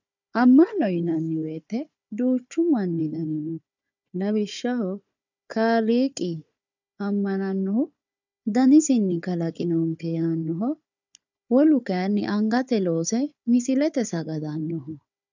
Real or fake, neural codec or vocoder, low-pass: fake; codec, 16 kHz, 4 kbps, FunCodec, trained on Chinese and English, 50 frames a second; 7.2 kHz